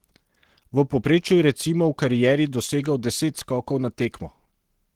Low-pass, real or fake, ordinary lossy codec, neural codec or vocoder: 19.8 kHz; fake; Opus, 16 kbps; codec, 44.1 kHz, 7.8 kbps, Pupu-Codec